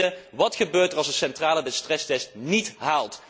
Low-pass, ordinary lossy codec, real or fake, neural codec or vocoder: none; none; real; none